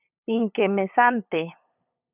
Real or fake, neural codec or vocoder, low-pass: fake; codec, 16 kHz, 8 kbps, FunCodec, trained on LibriTTS, 25 frames a second; 3.6 kHz